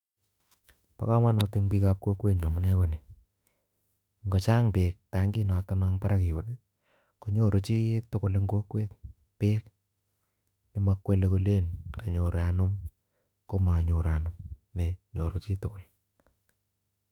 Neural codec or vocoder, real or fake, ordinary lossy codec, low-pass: autoencoder, 48 kHz, 32 numbers a frame, DAC-VAE, trained on Japanese speech; fake; none; 19.8 kHz